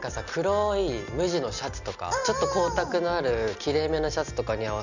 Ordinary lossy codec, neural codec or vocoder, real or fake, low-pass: none; none; real; 7.2 kHz